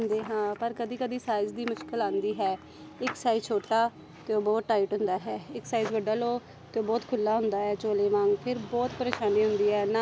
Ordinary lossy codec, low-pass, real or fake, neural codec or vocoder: none; none; real; none